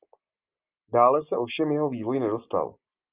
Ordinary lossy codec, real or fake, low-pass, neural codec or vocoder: Opus, 24 kbps; real; 3.6 kHz; none